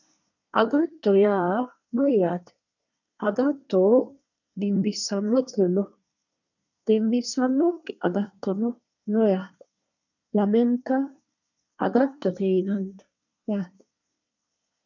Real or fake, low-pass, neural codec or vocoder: fake; 7.2 kHz; codec, 24 kHz, 1 kbps, SNAC